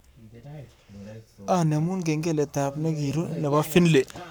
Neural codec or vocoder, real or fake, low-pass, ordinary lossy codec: codec, 44.1 kHz, 7.8 kbps, Pupu-Codec; fake; none; none